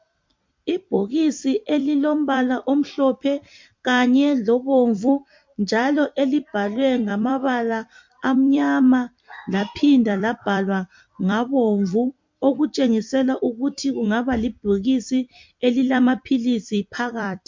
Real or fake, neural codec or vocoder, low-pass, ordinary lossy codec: fake; vocoder, 44.1 kHz, 128 mel bands every 256 samples, BigVGAN v2; 7.2 kHz; MP3, 48 kbps